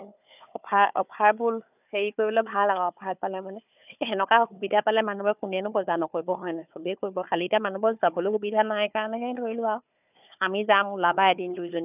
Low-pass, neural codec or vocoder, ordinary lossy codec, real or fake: 3.6 kHz; codec, 16 kHz, 4 kbps, FunCodec, trained on Chinese and English, 50 frames a second; none; fake